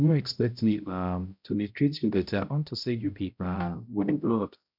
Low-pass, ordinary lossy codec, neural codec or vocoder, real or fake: 5.4 kHz; none; codec, 16 kHz, 0.5 kbps, X-Codec, HuBERT features, trained on balanced general audio; fake